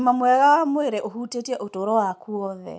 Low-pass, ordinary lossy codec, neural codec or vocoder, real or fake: none; none; none; real